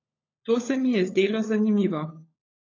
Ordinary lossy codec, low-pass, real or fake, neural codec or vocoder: AAC, 32 kbps; 7.2 kHz; fake; codec, 16 kHz, 16 kbps, FunCodec, trained on LibriTTS, 50 frames a second